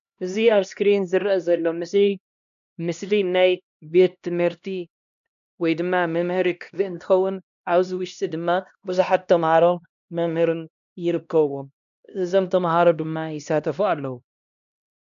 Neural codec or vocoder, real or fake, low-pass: codec, 16 kHz, 1 kbps, X-Codec, HuBERT features, trained on LibriSpeech; fake; 7.2 kHz